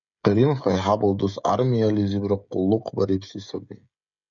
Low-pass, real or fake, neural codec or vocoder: 7.2 kHz; fake; codec, 16 kHz, 16 kbps, FreqCodec, smaller model